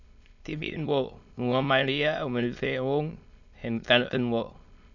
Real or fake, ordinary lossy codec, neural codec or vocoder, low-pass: fake; none; autoencoder, 22.05 kHz, a latent of 192 numbers a frame, VITS, trained on many speakers; 7.2 kHz